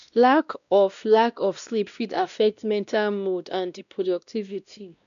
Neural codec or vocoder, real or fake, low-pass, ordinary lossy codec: codec, 16 kHz, 1 kbps, X-Codec, WavLM features, trained on Multilingual LibriSpeech; fake; 7.2 kHz; MP3, 96 kbps